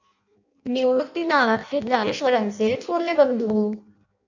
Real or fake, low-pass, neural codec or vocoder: fake; 7.2 kHz; codec, 16 kHz in and 24 kHz out, 0.6 kbps, FireRedTTS-2 codec